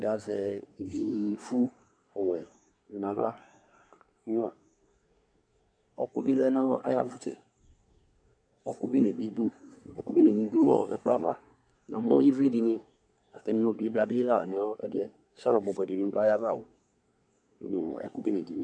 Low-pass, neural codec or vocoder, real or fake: 9.9 kHz; codec, 24 kHz, 1 kbps, SNAC; fake